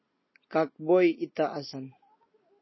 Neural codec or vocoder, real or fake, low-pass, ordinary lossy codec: none; real; 7.2 kHz; MP3, 24 kbps